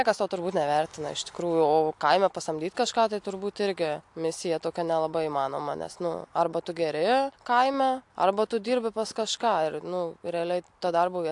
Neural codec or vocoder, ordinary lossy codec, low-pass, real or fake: none; AAC, 64 kbps; 10.8 kHz; real